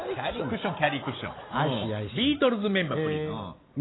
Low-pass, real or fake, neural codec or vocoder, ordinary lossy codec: 7.2 kHz; real; none; AAC, 16 kbps